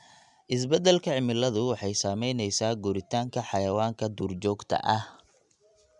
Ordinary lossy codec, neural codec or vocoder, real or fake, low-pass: none; none; real; 10.8 kHz